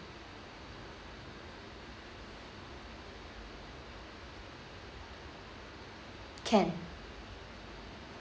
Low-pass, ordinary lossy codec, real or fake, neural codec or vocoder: none; none; real; none